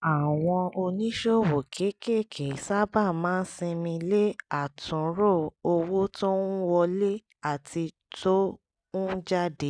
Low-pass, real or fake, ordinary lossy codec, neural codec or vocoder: 9.9 kHz; real; none; none